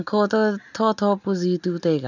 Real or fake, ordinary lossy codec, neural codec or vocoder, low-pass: real; none; none; 7.2 kHz